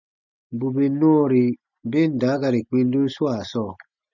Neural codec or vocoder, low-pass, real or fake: none; 7.2 kHz; real